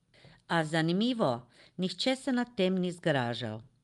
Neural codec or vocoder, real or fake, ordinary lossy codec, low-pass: none; real; Opus, 32 kbps; 10.8 kHz